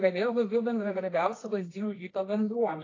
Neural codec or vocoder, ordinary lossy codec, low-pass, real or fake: codec, 24 kHz, 0.9 kbps, WavTokenizer, medium music audio release; AAC, 32 kbps; 7.2 kHz; fake